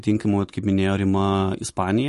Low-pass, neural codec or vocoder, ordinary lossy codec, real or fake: 14.4 kHz; vocoder, 44.1 kHz, 128 mel bands every 256 samples, BigVGAN v2; MP3, 48 kbps; fake